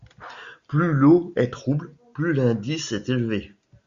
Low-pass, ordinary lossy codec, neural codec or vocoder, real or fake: 7.2 kHz; Opus, 64 kbps; none; real